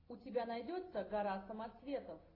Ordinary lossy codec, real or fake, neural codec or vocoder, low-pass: AAC, 24 kbps; real; none; 5.4 kHz